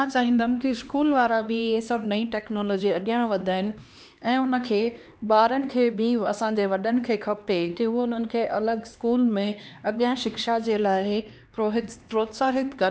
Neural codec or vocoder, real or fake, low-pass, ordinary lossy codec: codec, 16 kHz, 2 kbps, X-Codec, HuBERT features, trained on LibriSpeech; fake; none; none